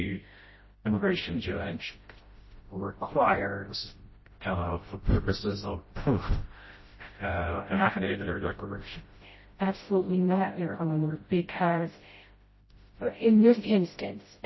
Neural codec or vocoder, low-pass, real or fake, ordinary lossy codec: codec, 16 kHz, 0.5 kbps, FreqCodec, smaller model; 7.2 kHz; fake; MP3, 24 kbps